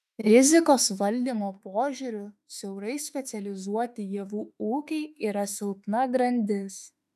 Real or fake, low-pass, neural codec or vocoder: fake; 14.4 kHz; autoencoder, 48 kHz, 32 numbers a frame, DAC-VAE, trained on Japanese speech